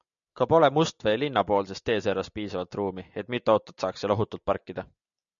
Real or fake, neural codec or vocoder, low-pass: real; none; 7.2 kHz